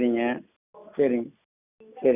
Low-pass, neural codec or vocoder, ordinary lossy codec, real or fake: 3.6 kHz; none; none; real